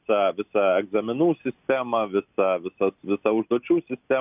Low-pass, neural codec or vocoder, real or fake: 3.6 kHz; none; real